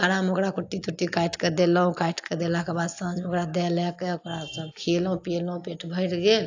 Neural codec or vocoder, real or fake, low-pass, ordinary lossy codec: none; real; 7.2 kHz; AAC, 48 kbps